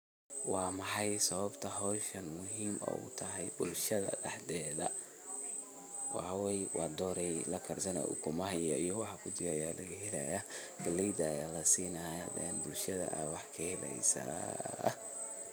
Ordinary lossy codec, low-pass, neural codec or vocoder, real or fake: none; none; vocoder, 44.1 kHz, 128 mel bands every 512 samples, BigVGAN v2; fake